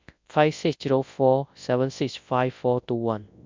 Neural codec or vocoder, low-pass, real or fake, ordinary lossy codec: codec, 24 kHz, 0.9 kbps, WavTokenizer, large speech release; 7.2 kHz; fake; MP3, 64 kbps